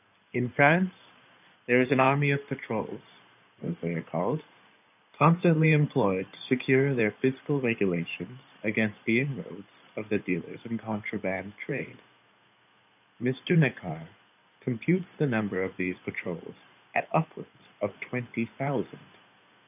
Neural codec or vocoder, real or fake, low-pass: codec, 16 kHz in and 24 kHz out, 2.2 kbps, FireRedTTS-2 codec; fake; 3.6 kHz